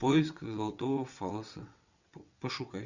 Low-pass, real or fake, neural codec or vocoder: 7.2 kHz; fake; vocoder, 22.05 kHz, 80 mel bands, WaveNeXt